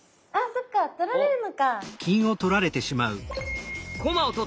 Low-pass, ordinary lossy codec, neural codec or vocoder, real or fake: none; none; none; real